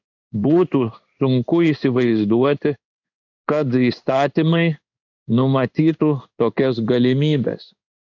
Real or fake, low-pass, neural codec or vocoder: fake; 7.2 kHz; codec, 16 kHz in and 24 kHz out, 1 kbps, XY-Tokenizer